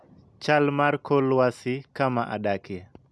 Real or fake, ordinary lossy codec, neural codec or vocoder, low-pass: real; none; none; none